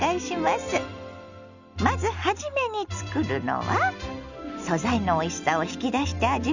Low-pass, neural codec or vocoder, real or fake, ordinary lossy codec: 7.2 kHz; none; real; none